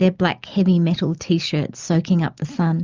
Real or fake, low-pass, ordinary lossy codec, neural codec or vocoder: real; 7.2 kHz; Opus, 24 kbps; none